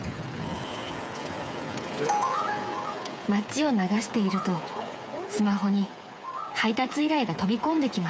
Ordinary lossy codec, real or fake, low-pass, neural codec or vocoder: none; fake; none; codec, 16 kHz, 8 kbps, FreqCodec, smaller model